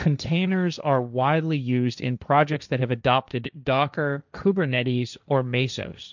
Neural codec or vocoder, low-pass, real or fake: codec, 16 kHz, 1.1 kbps, Voila-Tokenizer; 7.2 kHz; fake